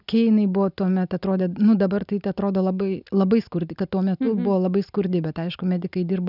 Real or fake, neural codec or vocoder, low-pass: real; none; 5.4 kHz